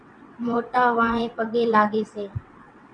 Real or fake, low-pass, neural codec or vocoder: fake; 9.9 kHz; vocoder, 22.05 kHz, 80 mel bands, WaveNeXt